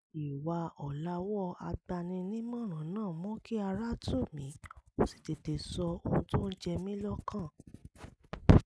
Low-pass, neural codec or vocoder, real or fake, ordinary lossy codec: none; none; real; none